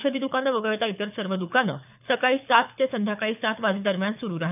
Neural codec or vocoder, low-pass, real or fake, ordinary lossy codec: codec, 24 kHz, 6 kbps, HILCodec; 3.6 kHz; fake; none